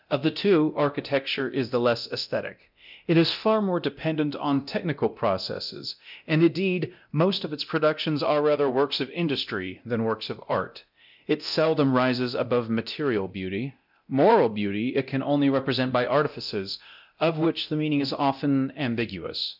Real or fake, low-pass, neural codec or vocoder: fake; 5.4 kHz; codec, 24 kHz, 0.9 kbps, DualCodec